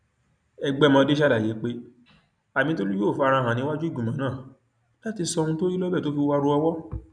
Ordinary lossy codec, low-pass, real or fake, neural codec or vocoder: none; 9.9 kHz; real; none